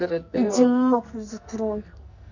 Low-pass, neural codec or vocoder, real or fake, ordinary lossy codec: 7.2 kHz; codec, 44.1 kHz, 2.6 kbps, SNAC; fake; none